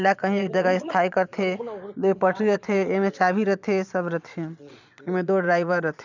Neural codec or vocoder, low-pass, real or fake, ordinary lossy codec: vocoder, 22.05 kHz, 80 mel bands, Vocos; 7.2 kHz; fake; none